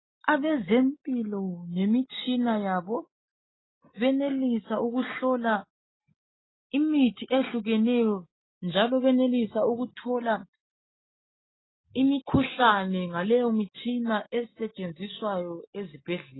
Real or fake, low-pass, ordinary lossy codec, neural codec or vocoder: real; 7.2 kHz; AAC, 16 kbps; none